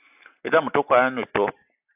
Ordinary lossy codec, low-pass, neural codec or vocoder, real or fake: AAC, 32 kbps; 3.6 kHz; none; real